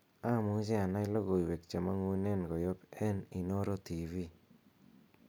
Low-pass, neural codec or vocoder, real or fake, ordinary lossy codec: none; none; real; none